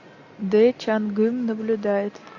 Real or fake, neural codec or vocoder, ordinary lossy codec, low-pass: real; none; AAC, 48 kbps; 7.2 kHz